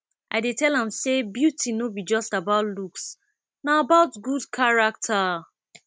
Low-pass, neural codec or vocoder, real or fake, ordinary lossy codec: none; none; real; none